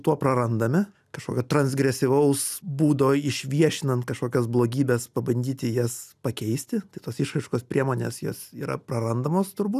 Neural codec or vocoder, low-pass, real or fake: none; 14.4 kHz; real